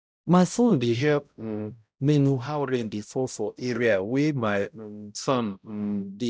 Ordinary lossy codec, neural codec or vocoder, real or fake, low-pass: none; codec, 16 kHz, 0.5 kbps, X-Codec, HuBERT features, trained on balanced general audio; fake; none